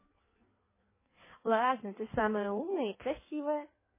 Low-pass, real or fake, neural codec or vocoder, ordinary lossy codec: 3.6 kHz; fake; codec, 16 kHz in and 24 kHz out, 1.1 kbps, FireRedTTS-2 codec; MP3, 16 kbps